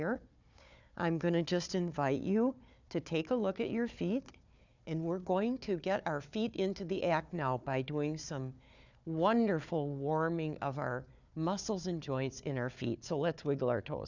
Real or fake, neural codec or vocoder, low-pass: fake; codec, 16 kHz, 4 kbps, FunCodec, trained on Chinese and English, 50 frames a second; 7.2 kHz